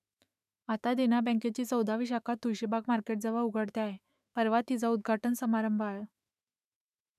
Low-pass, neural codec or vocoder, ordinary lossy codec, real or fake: 14.4 kHz; autoencoder, 48 kHz, 128 numbers a frame, DAC-VAE, trained on Japanese speech; none; fake